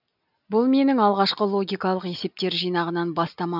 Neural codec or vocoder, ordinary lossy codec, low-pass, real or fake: none; none; 5.4 kHz; real